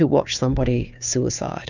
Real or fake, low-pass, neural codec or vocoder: fake; 7.2 kHz; codec, 16 kHz, 2 kbps, FunCodec, trained on Chinese and English, 25 frames a second